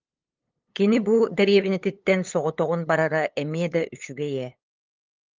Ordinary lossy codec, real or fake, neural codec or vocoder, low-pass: Opus, 24 kbps; fake; codec, 16 kHz, 8 kbps, FunCodec, trained on LibriTTS, 25 frames a second; 7.2 kHz